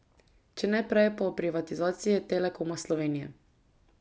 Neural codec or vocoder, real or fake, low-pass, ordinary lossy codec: none; real; none; none